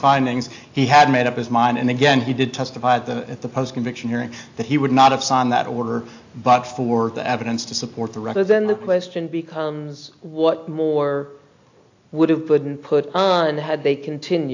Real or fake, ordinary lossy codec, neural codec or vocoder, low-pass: real; AAC, 48 kbps; none; 7.2 kHz